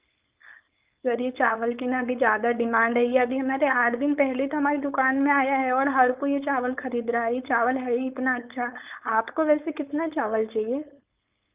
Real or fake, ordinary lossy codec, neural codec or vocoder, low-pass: fake; Opus, 24 kbps; codec, 16 kHz, 4.8 kbps, FACodec; 3.6 kHz